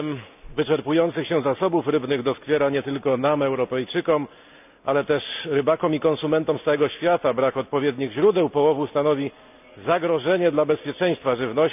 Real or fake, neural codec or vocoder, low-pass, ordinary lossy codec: real; none; 3.6 kHz; none